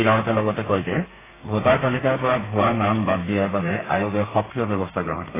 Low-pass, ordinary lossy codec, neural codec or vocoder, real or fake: 3.6 kHz; AAC, 16 kbps; codec, 32 kHz, 1.9 kbps, SNAC; fake